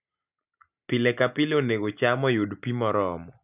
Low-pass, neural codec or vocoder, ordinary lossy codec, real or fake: 3.6 kHz; none; none; real